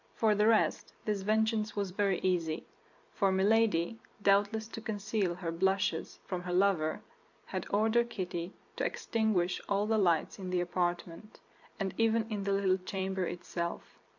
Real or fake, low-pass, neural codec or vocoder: fake; 7.2 kHz; vocoder, 44.1 kHz, 128 mel bands every 256 samples, BigVGAN v2